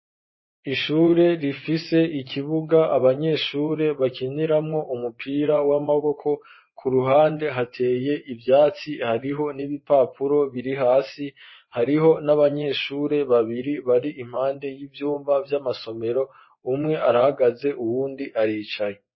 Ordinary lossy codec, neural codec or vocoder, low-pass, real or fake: MP3, 24 kbps; vocoder, 24 kHz, 100 mel bands, Vocos; 7.2 kHz; fake